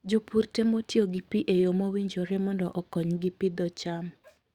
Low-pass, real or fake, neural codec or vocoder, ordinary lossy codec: 19.8 kHz; fake; codec, 44.1 kHz, 7.8 kbps, DAC; Opus, 64 kbps